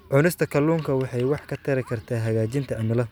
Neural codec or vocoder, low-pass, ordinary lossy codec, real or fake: none; none; none; real